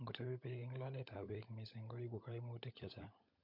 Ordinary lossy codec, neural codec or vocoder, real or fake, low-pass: MP3, 48 kbps; codec, 16 kHz, 16 kbps, FunCodec, trained on LibriTTS, 50 frames a second; fake; 5.4 kHz